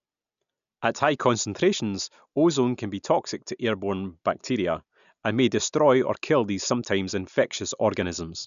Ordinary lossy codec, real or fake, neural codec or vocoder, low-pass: none; real; none; 7.2 kHz